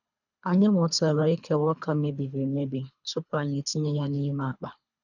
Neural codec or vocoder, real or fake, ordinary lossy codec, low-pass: codec, 24 kHz, 3 kbps, HILCodec; fake; none; 7.2 kHz